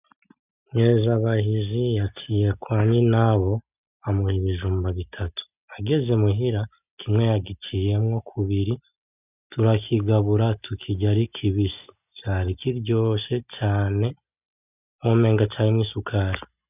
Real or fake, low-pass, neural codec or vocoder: real; 3.6 kHz; none